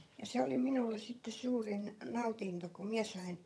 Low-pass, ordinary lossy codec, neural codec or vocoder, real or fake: none; none; vocoder, 22.05 kHz, 80 mel bands, HiFi-GAN; fake